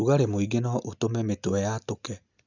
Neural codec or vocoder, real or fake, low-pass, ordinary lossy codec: none; real; 7.2 kHz; none